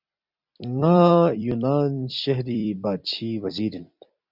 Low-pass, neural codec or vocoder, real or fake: 5.4 kHz; vocoder, 44.1 kHz, 128 mel bands every 256 samples, BigVGAN v2; fake